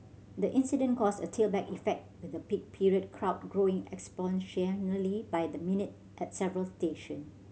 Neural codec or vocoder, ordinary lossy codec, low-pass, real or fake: none; none; none; real